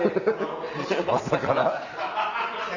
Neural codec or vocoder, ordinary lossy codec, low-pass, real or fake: none; none; 7.2 kHz; real